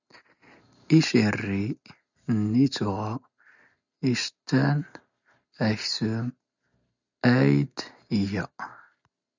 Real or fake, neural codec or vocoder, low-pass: real; none; 7.2 kHz